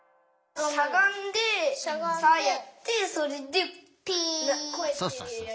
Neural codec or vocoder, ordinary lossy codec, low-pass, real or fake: none; none; none; real